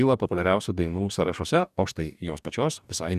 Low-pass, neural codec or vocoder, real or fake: 14.4 kHz; codec, 44.1 kHz, 2.6 kbps, DAC; fake